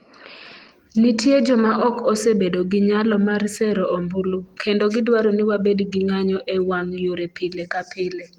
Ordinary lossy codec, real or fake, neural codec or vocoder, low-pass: Opus, 24 kbps; real; none; 19.8 kHz